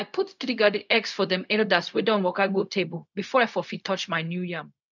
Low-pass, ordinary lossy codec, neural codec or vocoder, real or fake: 7.2 kHz; none; codec, 16 kHz, 0.4 kbps, LongCat-Audio-Codec; fake